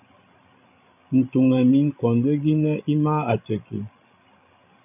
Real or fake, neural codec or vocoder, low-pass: fake; codec, 16 kHz, 16 kbps, FreqCodec, larger model; 3.6 kHz